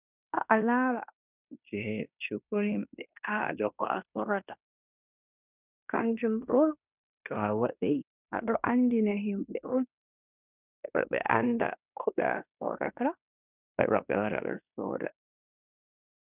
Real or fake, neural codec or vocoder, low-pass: fake; codec, 16 kHz in and 24 kHz out, 0.9 kbps, LongCat-Audio-Codec, fine tuned four codebook decoder; 3.6 kHz